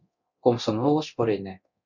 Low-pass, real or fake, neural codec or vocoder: 7.2 kHz; fake; codec, 24 kHz, 0.9 kbps, DualCodec